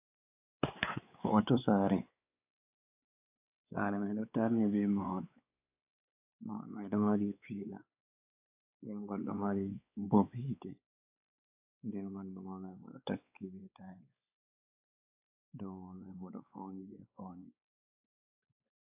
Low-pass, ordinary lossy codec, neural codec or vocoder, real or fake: 3.6 kHz; AAC, 32 kbps; codec, 16 kHz, 4 kbps, X-Codec, WavLM features, trained on Multilingual LibriSpeech; fake